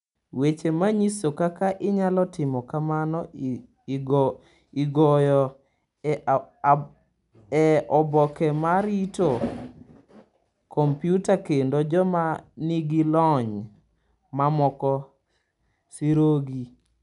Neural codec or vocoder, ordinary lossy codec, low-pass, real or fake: none; none; 10.8 kHz; real